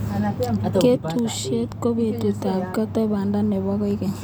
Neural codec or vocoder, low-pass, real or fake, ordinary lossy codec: none; none; real; none